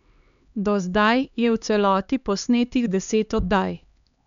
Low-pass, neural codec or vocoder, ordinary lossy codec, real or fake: 7.2 kHz; codec, 16 kHz, 2 kbps, X-Codec, HuBERT features, trained on LibriSpeech; none; fake